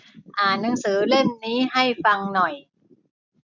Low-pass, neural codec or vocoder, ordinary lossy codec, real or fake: 7.2 kHz; none; none; real